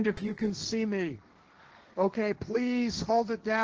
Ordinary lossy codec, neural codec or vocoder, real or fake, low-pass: Opus, 16 kbps; codec, 16 kHz, 1.1 kbps, Voila-Tokenizer; fake; 7.2 kHz